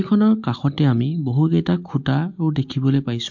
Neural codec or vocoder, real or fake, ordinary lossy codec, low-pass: none; real; MP3, 48 kbps; 7.2 kHz